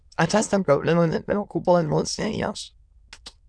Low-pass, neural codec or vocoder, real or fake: 9.9 kHz; autoencoder, 22.05 kHz, a latent of 192 numbers a frame, VITS, trained on many speakers; fake